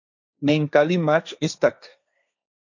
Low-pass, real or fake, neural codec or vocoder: 7.2 kHz; fake; codec, 16 kHz, 1.1 kbps, Voila-Tokenizer